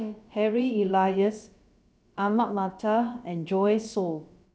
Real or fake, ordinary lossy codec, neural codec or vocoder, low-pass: fake; none; codec, 16 kHz, about 1 kbps, DyCAST, with the encoder's durations; none